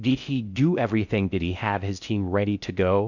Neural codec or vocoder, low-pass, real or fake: codec, 16 kHz in and 24 kHz out, 0.6 kbps, FocalCodec, streaming, 4096 codes; 7.2 kHz; fake